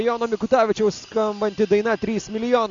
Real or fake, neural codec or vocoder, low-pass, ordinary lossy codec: real; none; 7.2 kHz; MP3, 64 kbps